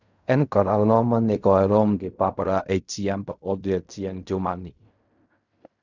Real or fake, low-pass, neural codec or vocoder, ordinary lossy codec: fake; 7.2 kHz; codec, 16 kHz in and 24 kHz out, 0.4 kbps, LongCat-Audio-Codec, fine tuned four codebook decoder; none